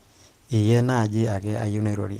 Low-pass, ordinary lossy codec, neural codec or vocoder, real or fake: 14.4 kHz; Opus, 16 kbps; none; real